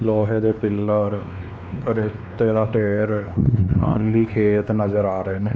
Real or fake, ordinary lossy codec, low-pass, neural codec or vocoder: fake; none; none; codec, 16 kHz, 2 kbps, X-Codec, WavLM features, trained on Multilingual LibriSpeech